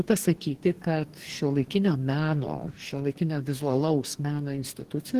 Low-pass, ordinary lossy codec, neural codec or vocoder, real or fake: 14.4 kHz; Opus, 16 kbps; codec, 44.1 kHz, 2.6 kbps, DAC; fake